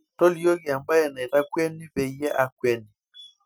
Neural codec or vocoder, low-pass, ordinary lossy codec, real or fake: none; none; none; real